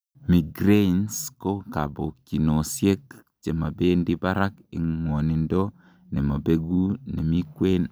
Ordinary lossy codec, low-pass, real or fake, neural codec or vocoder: none; none; real; none